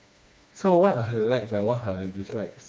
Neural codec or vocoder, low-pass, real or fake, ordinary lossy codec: codec, 16 kHz, 2 kbps, FreqCodec, smaller model; none; fake; none